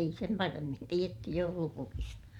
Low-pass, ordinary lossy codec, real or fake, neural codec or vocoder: 19.8 kHz; none; fake; vocoder, 44.1 kHz, 128 mel bands every 512 samples, BigVGAN v2